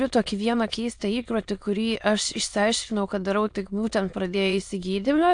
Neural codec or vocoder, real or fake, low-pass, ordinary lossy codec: autoencoder, 22.05 kHz, a latent of 192 numbers a frame, VITS, trained on many speakers; fake; 9.9 kHz; AAC, 64 kbps